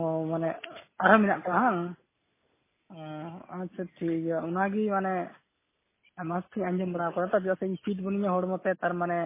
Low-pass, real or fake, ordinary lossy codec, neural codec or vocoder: 3.6 kHz; real; MP3, 16 kbps; none